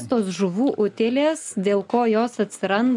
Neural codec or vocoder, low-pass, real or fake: none; 10.8 kHz; real